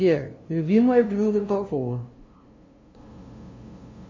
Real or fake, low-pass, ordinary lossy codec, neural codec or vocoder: fake; 7.2 kHz; MP3, 32 kbps; codec, 16 kHz, 0.5 kbps, FunCodec, trained on LibriTTS, 25 frames a second